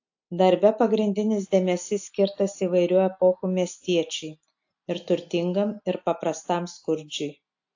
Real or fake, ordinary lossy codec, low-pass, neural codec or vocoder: real; AAC, 48 kbps; 7.2 kHz; none